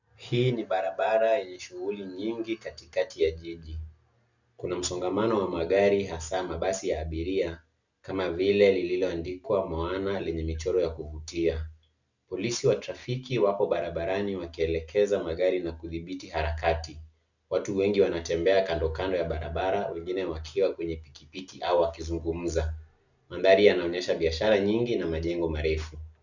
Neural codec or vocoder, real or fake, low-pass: none; real; 7.2 kHz